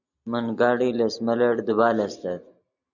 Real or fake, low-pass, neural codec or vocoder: real; 7.2 kHz; none